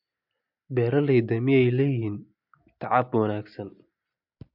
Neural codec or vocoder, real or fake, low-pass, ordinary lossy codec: none; real; 5.4 kHz; MP3, 48 kbps